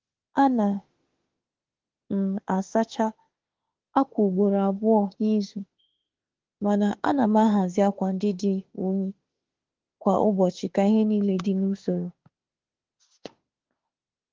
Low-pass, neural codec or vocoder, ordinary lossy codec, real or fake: 7.2 kHz; autoencoder, 48 kHz, 32 numbers a frame, DAC-VAE, trained on Japanese speech; Opus, 16 kbps; fake